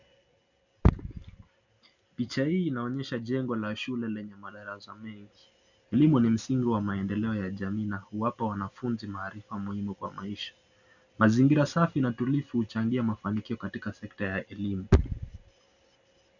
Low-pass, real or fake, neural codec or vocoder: 7.2 kHz; real; none